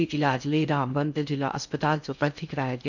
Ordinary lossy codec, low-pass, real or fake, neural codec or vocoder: none; 7.2 kHz; fake; codec, 16 kHz in and 24 kHz out, 0.6 kbps, FocalCodec, streaming, 4096 codes